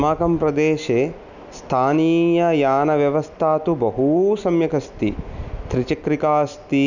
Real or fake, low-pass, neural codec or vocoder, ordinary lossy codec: real; 7.2 kHz; none; none